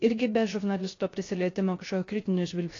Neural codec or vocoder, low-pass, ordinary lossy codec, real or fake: codec, 16 kHz, 0.3 kbps, FocalCodec; 7.2 kHz; AAC, 32 kbps; fake